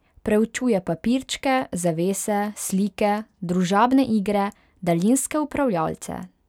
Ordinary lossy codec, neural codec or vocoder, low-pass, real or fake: none; none; 19.8 kHz; real